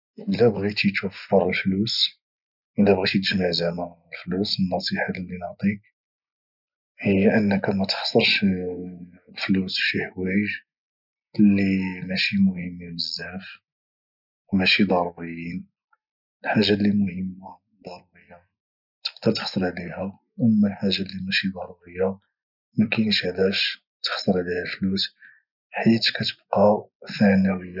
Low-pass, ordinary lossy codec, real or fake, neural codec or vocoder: 5.4 kHz; none; real; none